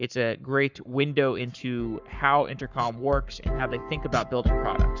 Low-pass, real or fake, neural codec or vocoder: 7.2 kHz; fake; codec, 44.1 kHz, 7.8 kbps, Pupu-Codec